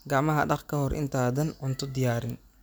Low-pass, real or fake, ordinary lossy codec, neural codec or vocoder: none; real; none; none